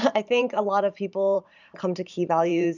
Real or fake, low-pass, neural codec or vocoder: fake; 7.2 kHz; vocoder, 44.1 kHz, 128 mel bands every 512 samples, BigVGAN v2